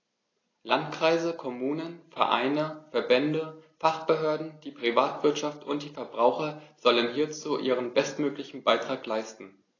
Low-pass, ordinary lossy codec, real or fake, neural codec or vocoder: 7.2 kHz; AAC, 32 kbps; real; none